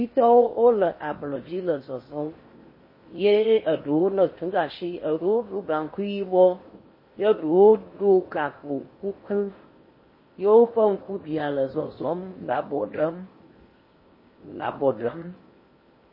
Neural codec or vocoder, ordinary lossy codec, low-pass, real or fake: codec, 16 kHz in and 24 kHz out, 0.8 kbps, FocalCodec, streaming, 65536 codes; MP3, 24 kbps; 5.4 kHz; fake